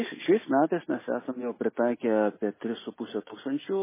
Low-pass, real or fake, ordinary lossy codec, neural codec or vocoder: 3.6 kHz; real; MP3, 16 kbps; none